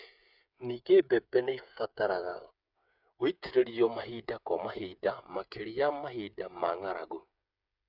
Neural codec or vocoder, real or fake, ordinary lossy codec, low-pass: codec, 16 kHz, 8 kbps, FreqCodec, smaller model; fake; none; 5.4 kHz